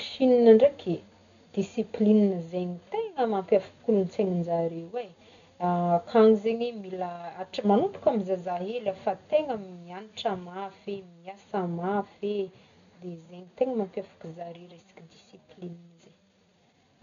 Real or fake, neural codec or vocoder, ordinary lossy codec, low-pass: real; none; none; 7.2 kHz